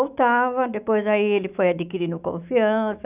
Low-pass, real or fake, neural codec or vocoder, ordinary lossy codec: 3.6 kHz; fake; autoencoder, 48 kHz, 128 numbers a frame, DAC-VAE, trained on Japanese speech; none